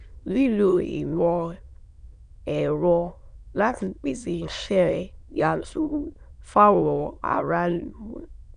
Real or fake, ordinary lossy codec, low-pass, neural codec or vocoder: fake; none; 9.9 kHz; autoencoder, 22.05 kHz, a latent of 192 numbers a frame, VITS, trained on many speakers